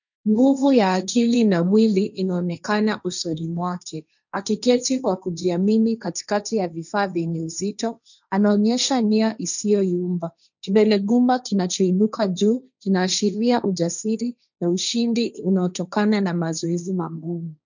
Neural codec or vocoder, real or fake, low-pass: codec, 16 kHz, 1.1 kbps, Voila-Tokenizer; fake; 7.2 kHz